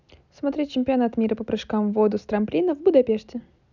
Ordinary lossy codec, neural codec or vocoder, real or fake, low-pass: none; none; real; 7.2 kHz